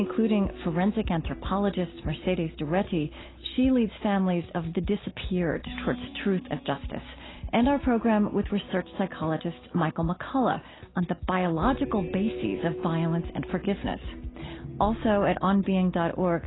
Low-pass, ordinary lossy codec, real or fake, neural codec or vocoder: 7.2 kHz; AAC, 16 kbps; real; none